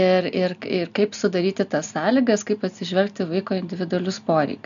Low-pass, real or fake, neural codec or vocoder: 7.2 kHz; real; none